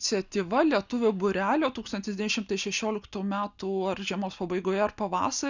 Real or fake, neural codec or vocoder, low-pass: real; none; 7.2 kHz